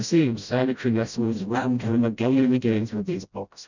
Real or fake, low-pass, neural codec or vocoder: fake; 7.2 kHz; codec, 16 kHz, 0.5 kbps, FreqCodec, smaller model